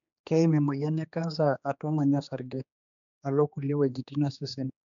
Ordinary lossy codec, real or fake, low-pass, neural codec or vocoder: none; fake; 7.2 kHz; codec, 16 kHz, 2 kbps, X-Codec, HuBERT features, trained on general audio